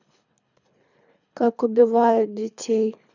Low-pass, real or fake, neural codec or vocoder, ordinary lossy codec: 7.2 kHz; fake; codec, 24 kHz, 3 kbps, HILCodec; none